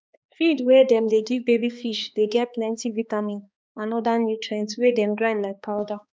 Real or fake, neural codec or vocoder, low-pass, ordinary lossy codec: fake; codec, 16 kHz, 2 kbps, X-Codec, HuBERT features, trained on balanced general audio; none; none